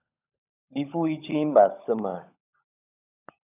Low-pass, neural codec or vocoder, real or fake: 3.6 kHz; codec, 16 kHz, 16 kbps, FunCodec, trained on LibriTTS, 50 frames a second; fake